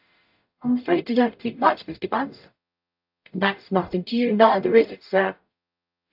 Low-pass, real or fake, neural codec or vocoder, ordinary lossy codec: 5.4 kHz; fake; codec, 44.1 kHz, 0.9 kbps, DAC; AAC, 48 kbps